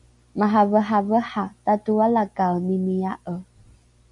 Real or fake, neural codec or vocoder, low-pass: real; none; 10.8 kHz